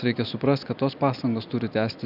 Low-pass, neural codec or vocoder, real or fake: 5.4 kHz; none; real